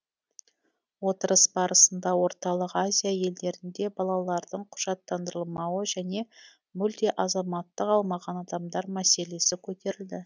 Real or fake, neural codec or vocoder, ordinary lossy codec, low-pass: real; none; none; none